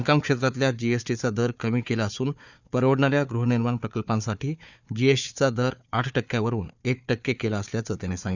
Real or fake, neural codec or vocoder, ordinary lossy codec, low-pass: fake; codec, 16 kHz, 4 kbps, FunCodec, trained on Chinese and English, 50 frames a second; none; 7.2 kHz